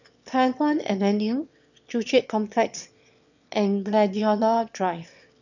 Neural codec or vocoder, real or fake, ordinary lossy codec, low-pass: autoencoder, 22.05 kHz, a latent of 192 numbers a frame, VITS, trained on one speaker; fake; none; 7.2 kHz